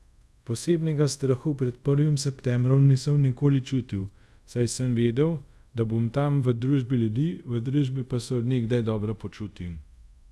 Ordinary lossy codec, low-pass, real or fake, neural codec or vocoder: none; none; fake; codec, 24 kHz, 0.5 kbps, DualCodec